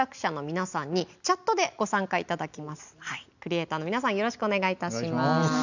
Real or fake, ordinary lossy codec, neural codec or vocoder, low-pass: real; none; none; 7.2 kHz